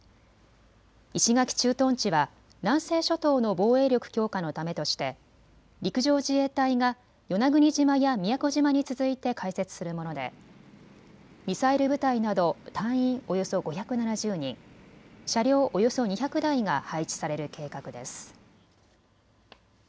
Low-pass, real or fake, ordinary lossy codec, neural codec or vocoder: none; real; none; none